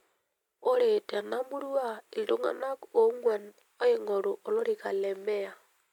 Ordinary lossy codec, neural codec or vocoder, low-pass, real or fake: MP3, 96 kbps; none; 19.8 kHz; real